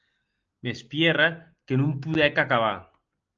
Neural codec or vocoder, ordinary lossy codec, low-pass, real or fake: none; Opus, 24 kbps; 7.2 kHz; real